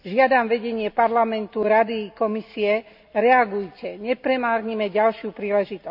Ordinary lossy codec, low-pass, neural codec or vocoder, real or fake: none; 5.4 kHz; none; real